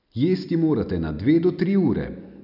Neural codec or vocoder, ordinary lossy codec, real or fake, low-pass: none; none; real; 5.4 kHz